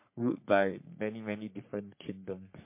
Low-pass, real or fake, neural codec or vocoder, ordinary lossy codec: 3.6 kHz; fake; codec, 44.1 kHz, 3.4 kbps, Pupu-Codec; MP3, 24 kbps